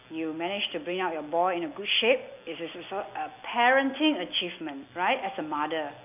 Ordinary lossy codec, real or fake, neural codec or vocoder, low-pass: none; real; none; 3.6 kHz